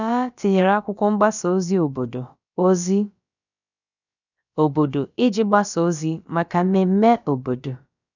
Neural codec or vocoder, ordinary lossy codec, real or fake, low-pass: codec, 16 kHz, about 1 kbps, DyCAST, with the encoder's durations; none; fake; 7.2 kHz